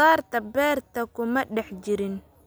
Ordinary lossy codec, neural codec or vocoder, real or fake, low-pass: none; none; real; none